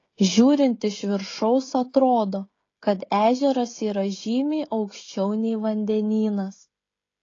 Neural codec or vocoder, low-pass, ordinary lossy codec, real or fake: codec, 16 kHz, 16 kbps, FreqCodec, smaller model; 7.2 kHz; AAC, 32 kbps; fake